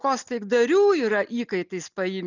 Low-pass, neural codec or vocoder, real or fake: 7.2 kHz; none; real